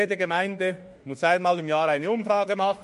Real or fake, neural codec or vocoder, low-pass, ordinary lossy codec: fake; autoencoder, 48 kHz, 32 numbers a frame, DAC-VAE, trained on Japanese speech; 14.4 kHz; MP3, 48 kbps